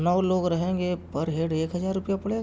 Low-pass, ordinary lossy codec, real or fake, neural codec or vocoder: none; none; real; none